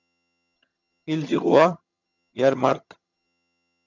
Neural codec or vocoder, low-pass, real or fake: vocoder, 22.05 kHz, 80 mel bands, HiFi-GAN; 7.2 kHz; fake